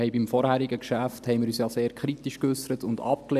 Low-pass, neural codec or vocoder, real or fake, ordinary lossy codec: 14.4 kHz; none; real; none